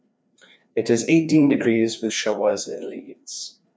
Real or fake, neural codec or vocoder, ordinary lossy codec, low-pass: fake; codec, 16 kHz, 2 kbps, FreqCodec, larger model; none; none